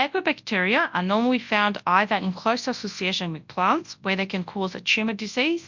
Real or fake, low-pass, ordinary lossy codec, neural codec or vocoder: fake; 7.2 kHz; MP3, 64 kbps; codec, 24 kHz, 0.9 kbps, WavTokenizer, large speech release